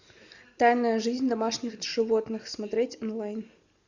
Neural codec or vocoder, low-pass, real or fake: none; 7.2 kHz; real